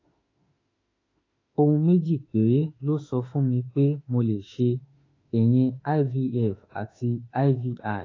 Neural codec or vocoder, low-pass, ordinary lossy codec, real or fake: autoencoder, 48 kHz, 32 numbers a frame, DAC-VAE, trained on Japanese speech; 7.2 kHz; AAC, 32 kbps; fake